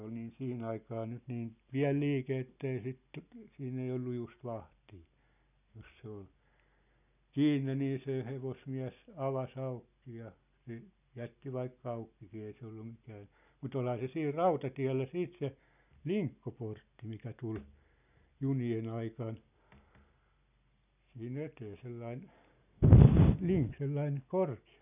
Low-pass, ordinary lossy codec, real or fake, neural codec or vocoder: 3.6 kHz; none; real; none